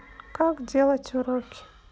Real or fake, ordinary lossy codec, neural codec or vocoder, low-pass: real; none; none; none